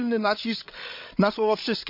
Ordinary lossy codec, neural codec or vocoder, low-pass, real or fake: none; codec, 16 kHz, 8 kbps, FreqCodec, larger model; 5.4 kHz; fake